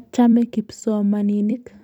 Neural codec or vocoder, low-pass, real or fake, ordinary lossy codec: vocoder, 44.1 kHz, 128 mel bands every 256 samples, BigVGAN v2; 19.8 kHz; fake; none